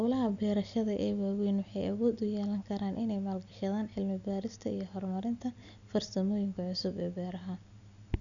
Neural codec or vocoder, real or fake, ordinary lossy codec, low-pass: none; real; none; 7.2 kHz